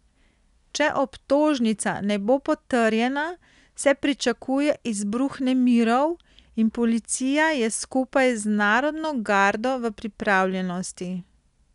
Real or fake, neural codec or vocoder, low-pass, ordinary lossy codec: real; none; 10.8 kHz; none